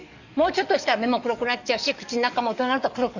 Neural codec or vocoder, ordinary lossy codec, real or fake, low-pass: codec, 44.1 kHz, 7.8 kbps, DAC; none; fake; 7.2 kHz